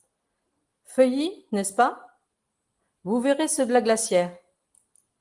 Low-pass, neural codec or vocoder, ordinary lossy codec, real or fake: 10.8 kHz; none; Opus, 24 kbps; real